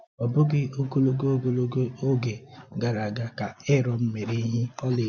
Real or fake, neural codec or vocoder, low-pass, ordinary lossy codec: real; none; none; none